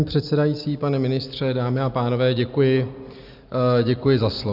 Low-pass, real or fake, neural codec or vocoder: 5.4 kHz; real; none